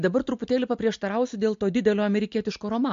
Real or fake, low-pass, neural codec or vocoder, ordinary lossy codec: real; 7.2 kHz; none; MP3, 48 kbps